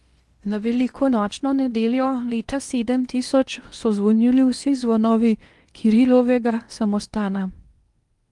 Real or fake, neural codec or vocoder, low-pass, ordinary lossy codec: fake; codec, 16 kHz in and 24 kHz out, 0.8 kbps, FocalCodec, streaming, 65536 codes; 10.8 kHz; Opus, 32 kbps